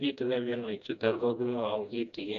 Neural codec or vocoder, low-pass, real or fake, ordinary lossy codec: codec, 16 kHz, 1 kbps, FreqCodec, smaller model; 7.2 kHz; fake; none